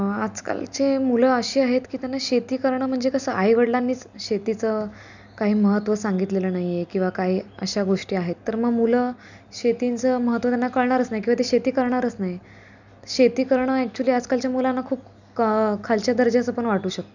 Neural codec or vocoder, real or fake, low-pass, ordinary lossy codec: none; real; 7.2 kHz; none